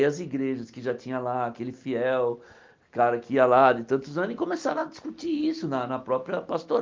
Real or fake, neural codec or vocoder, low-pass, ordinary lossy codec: real; none; 7.2 kHz; Opus, 32 kbps